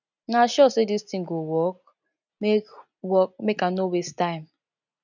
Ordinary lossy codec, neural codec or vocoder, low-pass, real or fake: none; none; 7.2 kHz; real